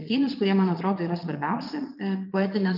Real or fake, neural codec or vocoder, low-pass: fake; vocoder, 22.05 kHz, 80 mel bands, Vocos; 5.4 kHz